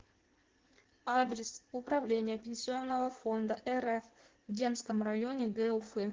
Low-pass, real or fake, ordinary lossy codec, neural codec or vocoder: 7.2 kHz; fake; Opus, 16 kbps; codec, 16 kHz in and 24 kHz out, 1.1 kbps, FireRedTTS-2 codec